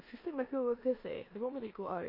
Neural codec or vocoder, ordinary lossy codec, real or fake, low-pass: codec, 16 kHz in and 24 kHz out, 0.9 kbps, LongCat-Audio-Codec, four codebook decoder; MP3, 32 kbps; fake; 5.4 kHz